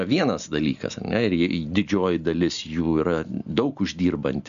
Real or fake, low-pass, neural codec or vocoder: real; 7.2 kHz; none